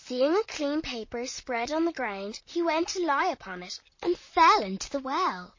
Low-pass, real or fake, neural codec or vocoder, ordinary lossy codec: 7.2 kHz; fake; vocoder, 44.1 kHz, 128 mel bands, Pupu-Vocoder; MP3, 32 kbps